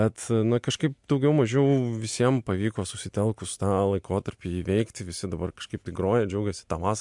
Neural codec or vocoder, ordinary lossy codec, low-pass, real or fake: none; MP3, 64 kbps; 10.8 kHz; real